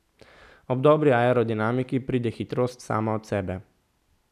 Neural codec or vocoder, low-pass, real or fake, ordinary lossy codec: none; 14.4 kHz; real; none